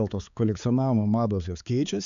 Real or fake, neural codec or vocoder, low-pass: fake; codec, 16 kHz, 4 kbps, X-Codec, HuBERT features, trained on balanced general audio; 7.2 kHz